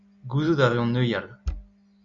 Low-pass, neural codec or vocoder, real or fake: 7.2 kHz; none; real